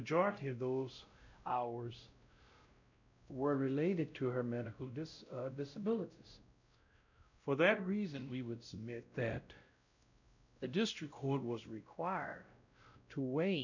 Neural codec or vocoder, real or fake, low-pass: codec, 16 kHz, 0.5 kbps, X-Codec, WavLM features, trained on Multilingual LibriSpeech; fake; 7.2 kHz